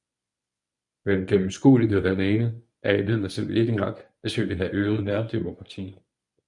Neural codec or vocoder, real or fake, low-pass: codec, 24 kHz, 0.9 kbps, WavTokenizer, medium speech release version 1; fake; 10.8 kHz